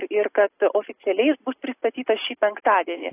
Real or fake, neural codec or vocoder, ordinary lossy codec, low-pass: real; none; AAC, 24 kbps; 3.6 kHz